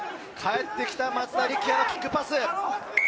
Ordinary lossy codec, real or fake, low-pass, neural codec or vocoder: none; real; none; none